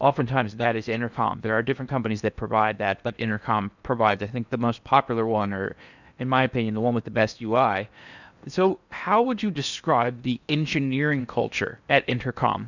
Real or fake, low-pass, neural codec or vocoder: fake; 7.2 kHz; codec, 16 kHz in and 24 kHz out, 0.8 kbps, FocalCodec, streaming, 65536 codes